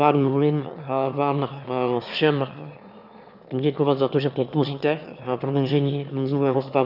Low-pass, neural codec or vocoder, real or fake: 5.4 kHz; autoencoder, 22.05 kHz, a latent of 192 numbers a frame, VITS, trained on one speaker; fake